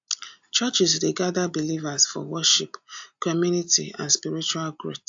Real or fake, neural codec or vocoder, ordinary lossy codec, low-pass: real; none; AAC, 64 kbps; 7.2 kHz